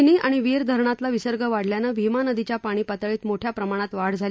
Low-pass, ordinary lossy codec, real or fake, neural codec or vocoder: none; none; real; none